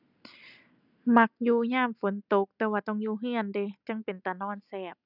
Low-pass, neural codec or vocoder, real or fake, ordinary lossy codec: 5.4 kHz; none; real; none